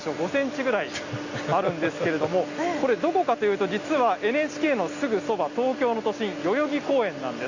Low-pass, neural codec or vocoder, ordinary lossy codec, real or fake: 7.2 kHz; none; Opus, 64 kbps; real